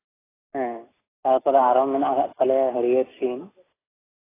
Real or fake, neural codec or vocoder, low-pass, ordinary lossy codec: real; none; 3.6 kHz; AAC, 16 kbps